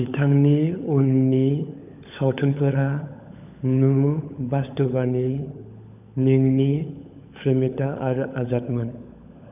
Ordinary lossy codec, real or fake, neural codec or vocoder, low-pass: none; fake; codec, 16 kHz, 16 kbps, FunCodec, trained on LibriTTS, 50 frames a second; 3.6 kHz